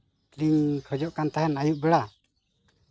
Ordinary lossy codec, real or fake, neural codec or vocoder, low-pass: none; real; none; none